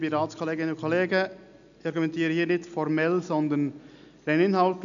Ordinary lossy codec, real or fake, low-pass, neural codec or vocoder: none; real; 7.2 kHz; none